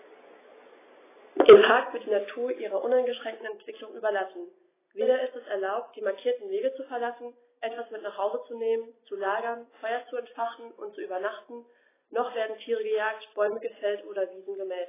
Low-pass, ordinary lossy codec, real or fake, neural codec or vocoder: 3.6 kHz; AAC, 16 kbps; real; none